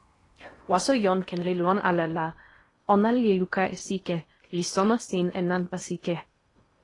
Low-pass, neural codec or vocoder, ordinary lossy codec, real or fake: 10.8 kHz; codec, 16 kHz in and 24 kHz out, 0.8 kbps, FocalCodec, streaming, 65536 codes; AAC, 32 kbps; fake